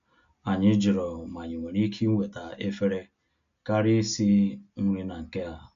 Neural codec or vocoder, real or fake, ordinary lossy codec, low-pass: none; real; MP3, 64 kbps; 7.2 kHz